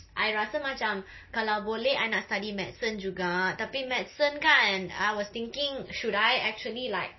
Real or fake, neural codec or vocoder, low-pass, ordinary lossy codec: real; none; 7.2 kHz; MP3, 24 kbps